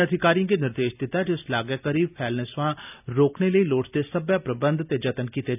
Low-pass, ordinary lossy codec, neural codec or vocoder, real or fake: 3.6 kHz; none; none; real